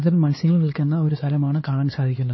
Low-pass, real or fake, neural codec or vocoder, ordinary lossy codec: 7.2 kHz; fake; codec, 16 kHz, 2 kbps, X-Codec, HuBERT features, trained on LibriSpeech; MP3, 24 kbps